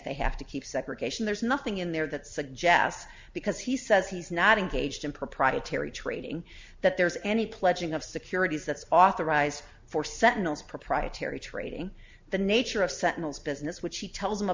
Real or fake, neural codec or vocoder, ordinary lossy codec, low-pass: real; none; MP3, 64 kbps; 7.2 kHz